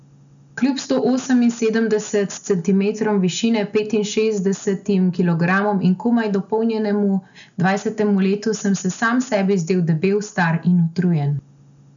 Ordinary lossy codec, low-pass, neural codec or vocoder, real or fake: none; 7.2 kHz; none; real